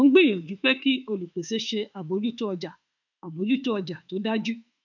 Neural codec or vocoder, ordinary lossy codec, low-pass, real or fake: autoencoder, 48 kHz, 32 numbers a frame, DAC-VAE, trained on Japanese speech; none; 7.2 kHz; fake